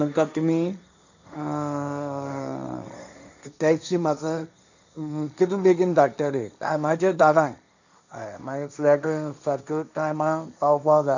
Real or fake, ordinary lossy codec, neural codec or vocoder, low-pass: fake; none; codec, 16 kHz, 1.1 kbps, Voila-Tokenizer; 7.2 kHz